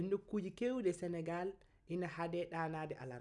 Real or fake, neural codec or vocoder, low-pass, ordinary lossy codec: real; none; 10.8 kHz; none